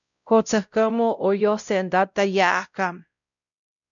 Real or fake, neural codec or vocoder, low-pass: fake; codec, 16 kHz, 0.5 kbps, X-Codec, WavLM features, trained on Multilingual LibriSpeech; 7.2 kHz